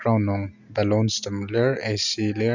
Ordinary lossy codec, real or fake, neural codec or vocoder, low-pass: Opus, 64 kbps; real; none; 7.2 kHz